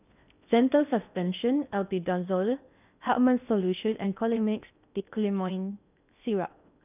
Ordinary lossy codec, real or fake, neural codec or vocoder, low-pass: none; fake; codec, 16 kHz in and 24 kHz out, 0.6 kbps, FocalCodec, streaming, 4096 codes; 3.6 kHz